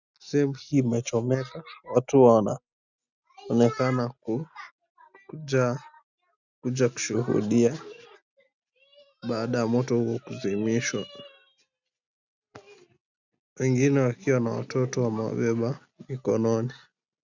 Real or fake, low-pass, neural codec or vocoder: real; 7.2 kHz; none